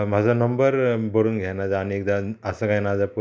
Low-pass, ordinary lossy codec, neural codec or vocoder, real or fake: none; none; none; real